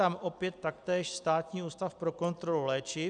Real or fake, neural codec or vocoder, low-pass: real; none; 9.9 kHz